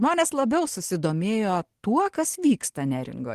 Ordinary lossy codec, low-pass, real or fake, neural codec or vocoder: Opus, 16 kbps; 14.4 kHz; real; none